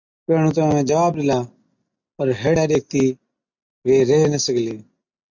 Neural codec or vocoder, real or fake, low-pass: none; real; 7.2 kHz